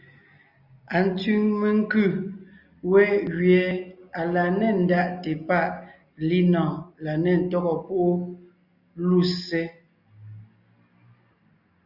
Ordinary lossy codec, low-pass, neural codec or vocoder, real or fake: Opus, 64 kbps; 5.4 kHz; none; real